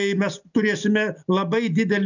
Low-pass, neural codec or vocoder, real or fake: 7.2 kHz; none; real